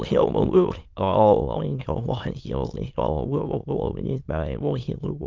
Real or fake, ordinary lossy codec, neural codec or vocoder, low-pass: fake; Opus, 32 kbps; autoencoder, 22.05 kHz, a latent of 192 numbers a frame, VITS, trained on many speakers; 7.2 kHz